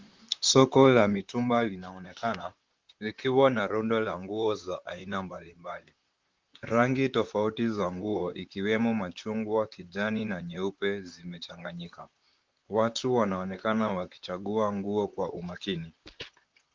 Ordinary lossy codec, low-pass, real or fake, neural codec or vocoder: Opus, 32 kbps; 7.2 kHz; fake; vocoder, 44.1 kHz, 128 mel bands, Pupu-Vocoder